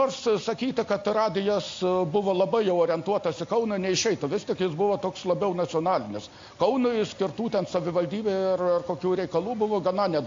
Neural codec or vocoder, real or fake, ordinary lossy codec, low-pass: none; real; AAC, 48 kbps; 7.2 kHz